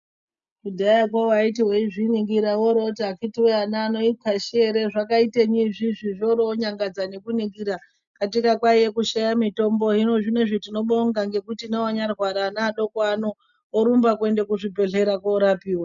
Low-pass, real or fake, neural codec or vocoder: 7.2 kHz; real; none